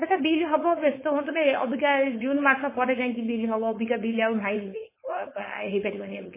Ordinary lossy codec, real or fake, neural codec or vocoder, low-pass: MP3, 16 kbps; fake; codec, 16 kHz, 4.8 kbps, FACodec; 3.6 kHz